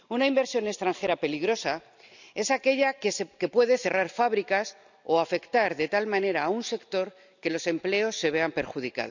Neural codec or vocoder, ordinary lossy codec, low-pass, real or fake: none; none; 7.2 kHz; real